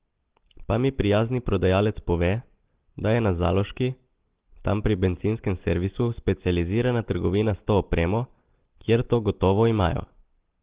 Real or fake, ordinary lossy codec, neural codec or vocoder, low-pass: real; Opus, 16 kbps; none; 3.6 kHz